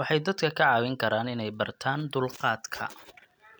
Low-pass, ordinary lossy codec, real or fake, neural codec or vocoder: none; none; real; none